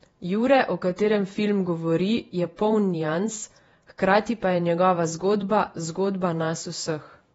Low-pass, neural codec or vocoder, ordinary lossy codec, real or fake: 19.8 kHz; vocoder, 48 kHz, 128 mel bands, Vocos; AAC, 24 kbps; fake